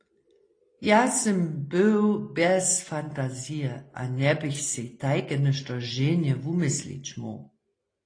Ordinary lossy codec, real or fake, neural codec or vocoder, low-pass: AAC, 32 kbps; real; none; 9.9 kHz